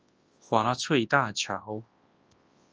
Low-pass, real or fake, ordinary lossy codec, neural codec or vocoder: 7.2 kHz; fake; Opus, 24 kbps; codec, 24 kHz, 0.9 kbps, WavTokenizer, large speech release